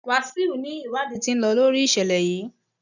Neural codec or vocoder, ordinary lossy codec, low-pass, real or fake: none; none; 7.2 kHz; real